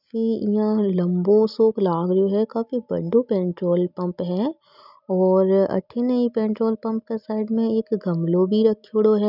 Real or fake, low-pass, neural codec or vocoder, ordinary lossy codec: real; 5.4 kHz; none; none